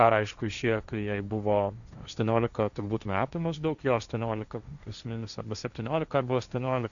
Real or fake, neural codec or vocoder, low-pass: fake; codec, 16 kHz, 1.1 kbps, Voila-Tokenizer; 7.2 kHz